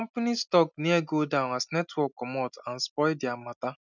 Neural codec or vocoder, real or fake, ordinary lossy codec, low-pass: none; real; none; 7.2 kHz